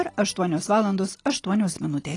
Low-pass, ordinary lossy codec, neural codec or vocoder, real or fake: 10.8 kHz; AAC, 32 kbps; none; real